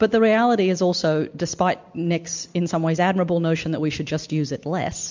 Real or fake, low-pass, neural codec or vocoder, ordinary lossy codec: real; 7.2 kHz; none; MP3, 64 kbps